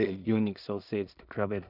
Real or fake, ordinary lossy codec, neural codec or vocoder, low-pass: fake; none; codec, 16 kHz in and 24 kHz out, 0.4 kbps, LongCat-Audio-Codec, two codebook decoder; 5.4 kHz